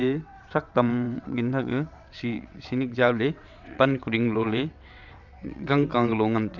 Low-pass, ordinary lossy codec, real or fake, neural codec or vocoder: 7.2 kHz; none; fake; vocoder, 22.05 kHz, 80 mel bands, WaveNeXt